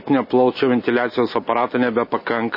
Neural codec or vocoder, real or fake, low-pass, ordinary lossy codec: none; real; 5.4 kHz; MP3, 24 kbps